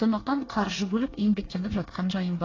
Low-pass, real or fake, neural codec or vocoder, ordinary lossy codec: 7.2 kHz; fake; codec, 24 kHz, 1 kbps, SNAC; AAC, 32 kbps